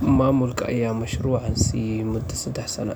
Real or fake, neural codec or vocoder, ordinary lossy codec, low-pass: real; none; none; none